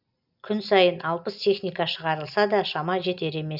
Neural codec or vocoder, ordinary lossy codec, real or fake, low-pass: none; none; real; 5.4 kHz